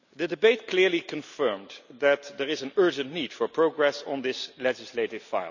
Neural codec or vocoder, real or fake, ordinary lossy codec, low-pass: none; real; none; 7.2 kHz